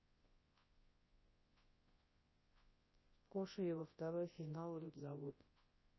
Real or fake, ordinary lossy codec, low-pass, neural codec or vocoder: fake; MP3, 24 kbps; 7.2 kHz; codec, 24 kHz, 0.9 kbps, WavTokenizer, large speech release